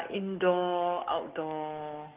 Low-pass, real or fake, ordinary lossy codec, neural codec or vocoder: 3.6 kHz; fake; Opus, 32 kbps; codec, 44.1 kHz, 7.8 kbps, DAC